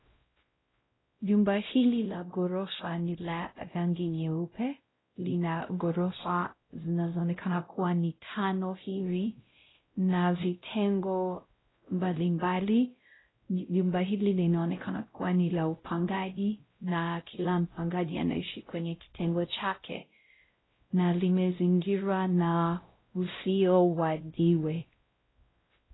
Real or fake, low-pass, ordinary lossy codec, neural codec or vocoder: fake; 7.2 kHz; AAC, 16 kbps; codec, 16 kHz, 0.5 kbps, X-Codec, WavLM features, trained on Multilingual LibriSpeech